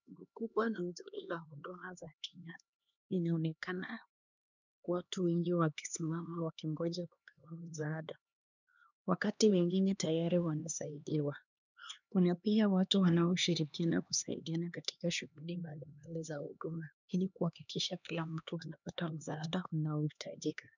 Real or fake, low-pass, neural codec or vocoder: fake; 7.2 kHz; codec, 16 kHz, 2 kbps, X-Codec, HuBERT features, trained on LibriSpeech